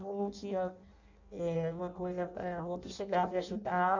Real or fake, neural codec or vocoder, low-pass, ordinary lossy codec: fake; codec, 16 kHz in and 24 kHz out, 0.6 kbps, FireRedTTS-2 codec; 7.2 kHz; none